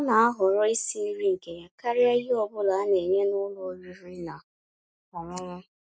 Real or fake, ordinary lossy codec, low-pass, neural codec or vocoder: real; none; none; none